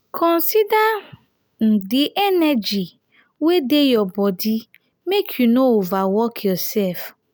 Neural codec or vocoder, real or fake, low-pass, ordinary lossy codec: none; real; none; none